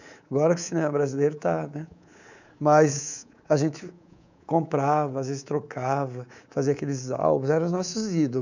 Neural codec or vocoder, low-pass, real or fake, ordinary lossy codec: codec, 24 kHz, 3.1 kbps, DualCodec; 7.2 kHz; fake; none